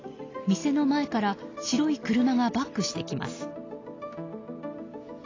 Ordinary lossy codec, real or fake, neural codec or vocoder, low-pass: AAC, 32 kbps; real; none; 7.2 kHz